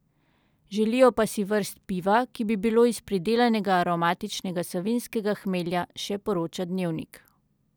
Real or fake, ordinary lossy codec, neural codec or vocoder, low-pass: real; none; none; none